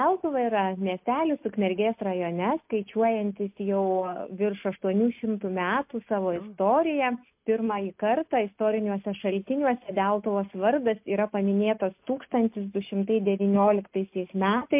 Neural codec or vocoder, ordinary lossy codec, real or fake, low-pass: none; MP3, 32 kbps; real; 3.6 kHz